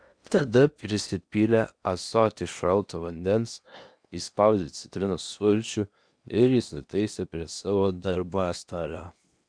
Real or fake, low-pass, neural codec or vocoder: fake; 9.9 kHz; codec, 16 kHz in and 24 kHz out, 0.8 kbps, FocalCodec, streaming, 65536 codes